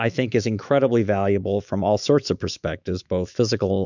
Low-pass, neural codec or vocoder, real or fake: 7.2 kHz; autoencoder, 48 kHz, 128 numbers a frame, DAC-VAE, trained on Japanese speech; fake